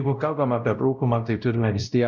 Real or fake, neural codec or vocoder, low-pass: fake; codec, 16 kHz, 0.5 kbps, X-Codec, WavLM features, trained on Multilingual LibriSpeech; 7.2 kHz